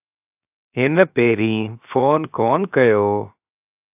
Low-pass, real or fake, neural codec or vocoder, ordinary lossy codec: 3.6 kHz; fake; codec, 16 kHz, 0.7 kbps, FocalCodec; AAC, 32 kbps